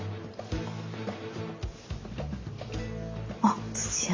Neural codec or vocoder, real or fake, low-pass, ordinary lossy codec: none; real; 7.2 kHz; AAC, 32 kbps